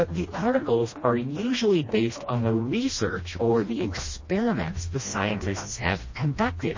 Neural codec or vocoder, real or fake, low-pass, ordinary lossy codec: codec, 16 kHz, 1 kbps, FreqCodec, smaller model; fake; 7.2 kHz; MP3, 32 kbps